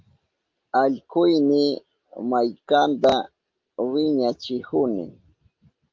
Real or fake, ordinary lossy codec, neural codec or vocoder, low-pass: real; Opus, 24 kbps; none; 7.2 kHz